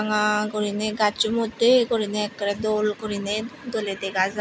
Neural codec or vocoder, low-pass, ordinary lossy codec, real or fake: none; none; none; real